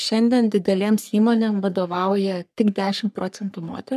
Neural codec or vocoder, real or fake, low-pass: codec, 44.1 kHz, 3.4 kbps, Pupu-Codec; fake; 14.4 kHz